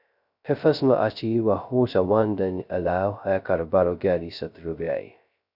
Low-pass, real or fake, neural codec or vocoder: 5.4 kHz; fake; codec, 16 kHz, 0.2 kbps, FocalCodec